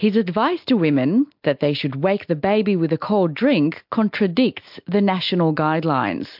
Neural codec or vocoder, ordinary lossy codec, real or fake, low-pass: none; MP3, 48 kbps; real; 5.4 kHz